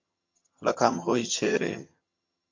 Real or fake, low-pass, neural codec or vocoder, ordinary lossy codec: fake; 7.2 kHz; vocoder, 22.05 kHz, 80 mel bands, HiFi-GAN; MP3, 48 kbps